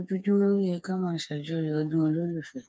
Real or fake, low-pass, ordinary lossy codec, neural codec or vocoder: fake; none; none; codec, 16 kHz, 4 kbps, FreqCodec, smaller model